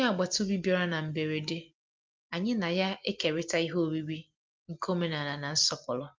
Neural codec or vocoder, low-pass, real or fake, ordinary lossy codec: none; 7.2 kHz; real; Opus, 24 kbps